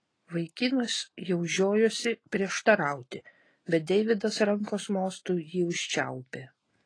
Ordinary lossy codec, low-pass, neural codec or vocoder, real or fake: AAC, 32 kbps; 9.9 kHz; none; real